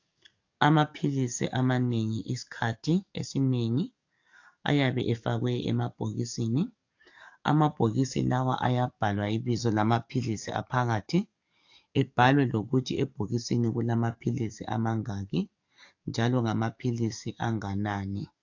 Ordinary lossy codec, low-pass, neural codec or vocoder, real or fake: AAC, 48 kbps; 7.2 kHz; codec, 44.1 kHz, 7.8 kbps, DAC; fake